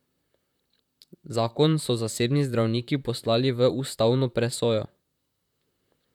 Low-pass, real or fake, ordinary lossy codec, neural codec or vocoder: 19.8 kHz; fake; none; vocoder, 44.1 kHz, 128 mel bands, Pupu-Vocoder